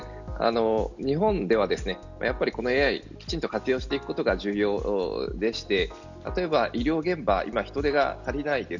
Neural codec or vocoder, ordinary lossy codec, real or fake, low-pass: none; none; real; 7.2 kHz